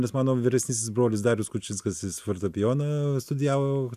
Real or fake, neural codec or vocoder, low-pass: real; none; 14.4 kHz